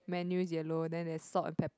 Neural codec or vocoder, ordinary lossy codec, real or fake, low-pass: none; none; real; none